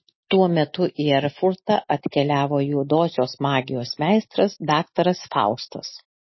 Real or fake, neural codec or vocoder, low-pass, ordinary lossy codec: real; none; 7.2 kHz; MP3, 24 kbps